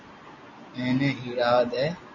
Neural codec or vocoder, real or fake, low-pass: none; real; 7.2 kHz